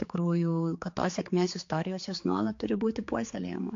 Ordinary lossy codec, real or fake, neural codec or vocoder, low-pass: AAC, 48 kbps; fake; codec, 16 kHz, 4 kbps, X-Codec, HuBERT features, trained on general audio; 7.2 kHz